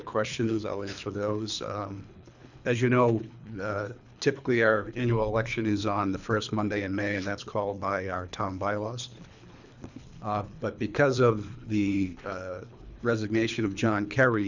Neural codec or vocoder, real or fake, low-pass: codec, 24 kHz, 3 kbps, HILCodec; fake; 7.2 kHz